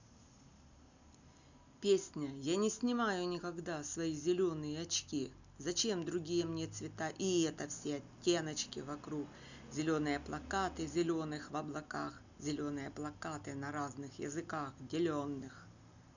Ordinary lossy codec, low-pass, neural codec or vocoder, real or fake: none; 7.2 kHz; none; real